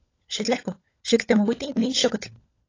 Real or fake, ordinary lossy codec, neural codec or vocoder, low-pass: fake; AAC, 32 kbps; codec, 16 kHz, 16 kbps, FunCodec, trained on LibriTTS, 50 frames a second; 7.2 kHz